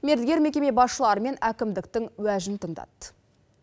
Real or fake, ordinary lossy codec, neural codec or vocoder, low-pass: real; none; none; none